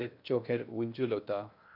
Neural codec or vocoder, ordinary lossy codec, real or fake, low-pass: codec, 16 kHz in and 24 kHz out, 0.6 kbps, FocalCodec, streaming, 2048 codes; none; fake; 5.4 kHz